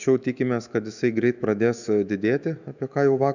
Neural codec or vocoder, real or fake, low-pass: autoencoder, 48 kHz, 128 numbers a frame, DAC-VAE, trained on Japanese speech; fake; 7.2 kHz